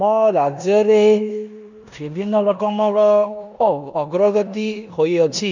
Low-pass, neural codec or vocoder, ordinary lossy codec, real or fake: 7.2 kHz; codec, 16 kHz in and 24 kHz out, 0.9 kbps, LongCat-Audio-Codec, four codebook decoder; none; fake